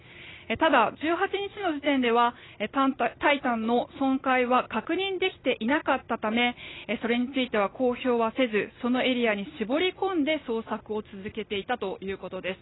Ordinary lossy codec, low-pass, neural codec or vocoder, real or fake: AAC, 16 kbps; 7.2 kHz; none; real